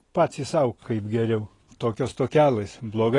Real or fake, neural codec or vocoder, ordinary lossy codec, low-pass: real; none; AAC, 32 kbps; 10.8 kHz